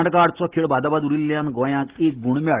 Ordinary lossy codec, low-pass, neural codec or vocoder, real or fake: Opus, 16 kbps; 3.6 kHz; none; real